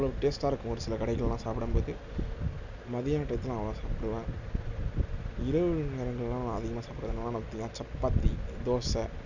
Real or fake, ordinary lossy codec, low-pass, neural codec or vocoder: real; none; 7.2 kHz; none